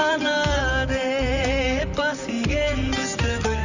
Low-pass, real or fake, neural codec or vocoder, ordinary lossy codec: 7.2 kHz; fake; vocoder, 44.1 kHz, 128 mel bands, Pupu-Vocoder; none